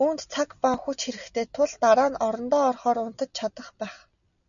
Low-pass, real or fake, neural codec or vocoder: 7.2 kHz; real; none